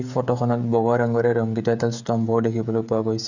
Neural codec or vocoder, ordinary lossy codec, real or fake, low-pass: codec, 16 kHz, 16 kbps, FreqCodec, smaller model; none; fake; 7.2 kHz